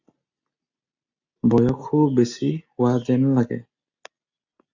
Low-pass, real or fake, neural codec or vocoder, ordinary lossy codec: 7.2 kHz; real; none; AAC, 48 kbps